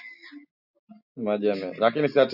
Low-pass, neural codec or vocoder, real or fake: 5.4 kHz; none; real